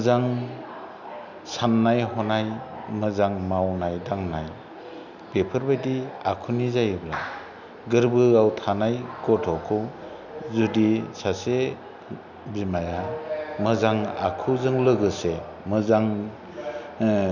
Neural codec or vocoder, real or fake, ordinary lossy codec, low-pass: none; real; none; 7.2 kHz